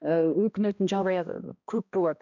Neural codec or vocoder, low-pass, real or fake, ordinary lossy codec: codec, 16 kHz, 1 kbps, X-Codec, HuBERT features, trained on balanced general audio; 7.2 kHz; fake; none